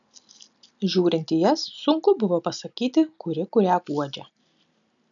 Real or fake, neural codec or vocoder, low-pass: real; none; 7.2 kHz